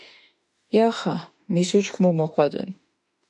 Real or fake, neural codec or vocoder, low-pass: fake; autoencoder, 48 kHz, 32 numbers a frame, DAC-VAE, trained on Japanese speech; 10.8 kHz